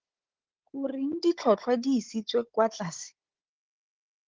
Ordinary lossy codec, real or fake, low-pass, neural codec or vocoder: Opus, 16 kbps; fake; 7.2 kHz; codec, 16 kHz, 16 kbps, FunCodec, trained on Chinese and English, 50 frames a second